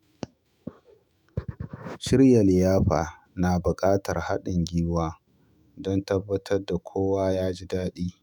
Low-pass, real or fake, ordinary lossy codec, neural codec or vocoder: none; fake; none; autoencoder, 48 kHz, 128 numbers a frame, DAC-VAE, trained on Japanese speech